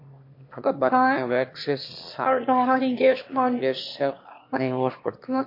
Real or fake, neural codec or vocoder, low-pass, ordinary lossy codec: fake; autoencoder, 22.05 kHz, a latent of 192 numbers a frame, VITS, trained on one speaker; 5.4 kHz; MP3, 32 kbps